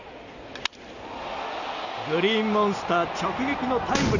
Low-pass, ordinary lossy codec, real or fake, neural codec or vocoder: 7.2 kHz; none; real; none